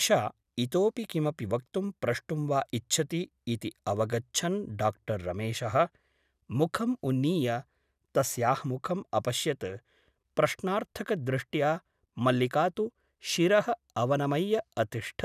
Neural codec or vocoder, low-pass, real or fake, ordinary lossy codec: none; 14.4 kHz; real; none